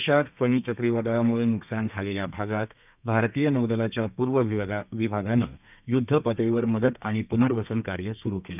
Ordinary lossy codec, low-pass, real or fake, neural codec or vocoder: none; 3.6 kHz; fake; codec, 32 kHz, 1.9 kbps, SNAC